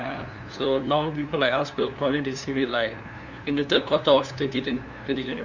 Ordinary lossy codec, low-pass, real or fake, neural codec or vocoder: none; 7.2 kHz; fake; codec, 16 kHz, 2 kbps, FunCodec, trained on LibriTTS, 25 frames a second